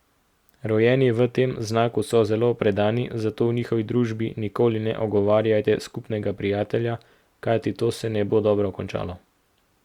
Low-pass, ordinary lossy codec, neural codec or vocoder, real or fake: 19.8 kHz; Opus, 64 kbps; none; real